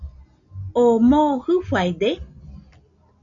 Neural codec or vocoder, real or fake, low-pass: none; real; 7.2 kHz